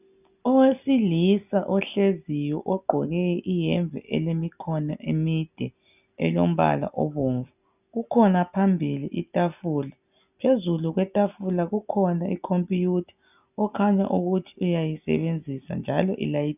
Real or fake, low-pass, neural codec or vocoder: real; 3.6 kHz; none